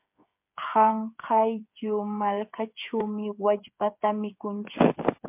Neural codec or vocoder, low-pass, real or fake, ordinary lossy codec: codec, 16 kHz, 8 kbps, FreqCodec, smaller model; 3.6 kHz; fake; MP3, 32 kbps